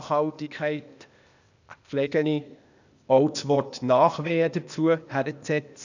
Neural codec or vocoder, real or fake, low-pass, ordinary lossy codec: codec, 16 kHz, 0.8 kbps, ZipCodec; fake; 7.2 kHz; none